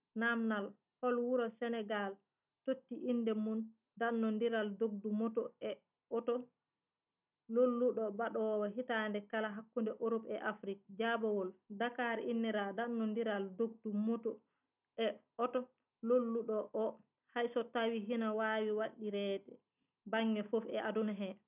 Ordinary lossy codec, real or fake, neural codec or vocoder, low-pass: none; real; none; 3.6 kHz